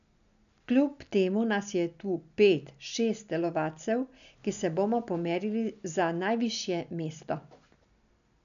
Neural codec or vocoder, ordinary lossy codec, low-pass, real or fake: none; none; 7.2 kHz; real